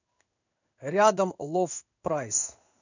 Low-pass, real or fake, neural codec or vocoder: 7.2 kHz; fake; codec, 16 kHz in and 24 kHz out, 1 kbps, XY-Tokenizer